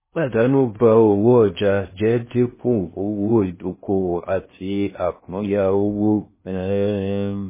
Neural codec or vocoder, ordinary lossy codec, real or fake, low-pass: codec, 16 kHz in and 24 kHz out, 0.6 kbps, FocalCodec, streaming, 2048 codes; MP3, 16 kbps; fake; 3.6 kHz